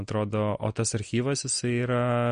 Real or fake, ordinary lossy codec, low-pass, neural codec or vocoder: real; MP3, 48 kbps; 9.9 kHz; none